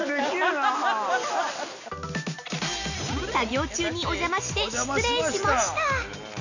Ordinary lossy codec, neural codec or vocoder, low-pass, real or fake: none; none; 7.2 kHz; real